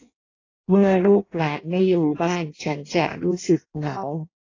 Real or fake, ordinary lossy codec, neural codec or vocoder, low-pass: fake; AAC, 32 kbps; codec, 16 kHz in and 24 kHz out, 0.6 kbps, FireRedTTS-2 codec; 7.2 kHz